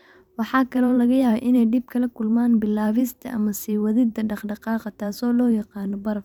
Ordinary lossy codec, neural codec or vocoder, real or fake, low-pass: none; vocoder, 44.1 kHz, 128 mel bands every 512 samples, BigVGAN v2; fake; 19.8 kHz